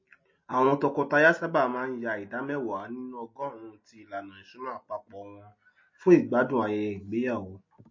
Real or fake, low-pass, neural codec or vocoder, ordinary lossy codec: real; 7.2 kHz; none; MP3, 32 kbps